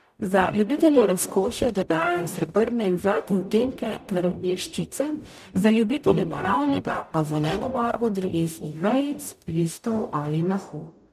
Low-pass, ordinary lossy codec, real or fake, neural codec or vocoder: 14.4 kHz; none; fake; codec, 44.1 kHz, 0.9 kbps, DAC